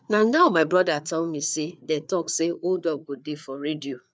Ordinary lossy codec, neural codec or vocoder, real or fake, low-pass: none; codec, 16 kHz, 4 kbps, FreqCodec, larger model; fake; none